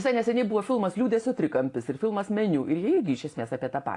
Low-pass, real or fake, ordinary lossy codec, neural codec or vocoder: 10.8 kHz; real; AAC, 48 kbps; none